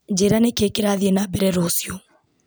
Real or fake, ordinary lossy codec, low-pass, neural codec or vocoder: real; none; none; none